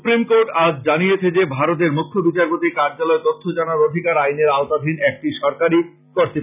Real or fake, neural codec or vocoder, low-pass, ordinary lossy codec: real; none; 3.6 kHz; none